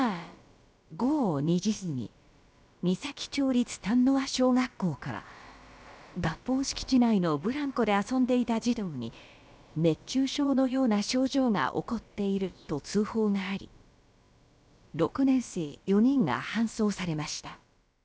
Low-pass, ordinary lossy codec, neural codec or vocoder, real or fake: none; none; codec, 16 kHz, about 1 kbps, DyCAST, with the encoder's durations; fake